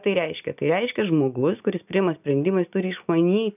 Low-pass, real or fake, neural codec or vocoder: 3.6 kHz; real; none